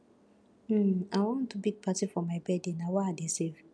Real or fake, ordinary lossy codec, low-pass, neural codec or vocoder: real; none; none; none